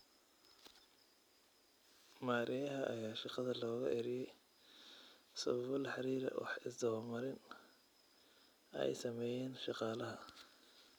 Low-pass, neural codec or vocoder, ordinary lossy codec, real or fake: none; none; none; real